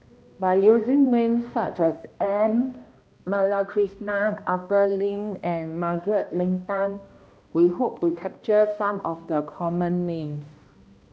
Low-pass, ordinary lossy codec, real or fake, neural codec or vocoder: none; none; fake; codec, 16 kHz, 1 kbps, X-Codec, HuBERT features, trained on balanced general audio